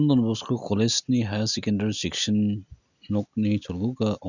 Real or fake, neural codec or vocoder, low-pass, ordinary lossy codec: real; none; 7.2 kHz; none